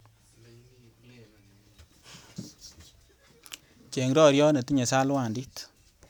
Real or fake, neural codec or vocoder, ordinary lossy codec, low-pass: real; none; none; none